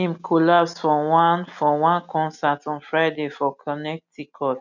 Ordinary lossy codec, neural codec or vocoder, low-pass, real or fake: none; none; 7.2 kHz; real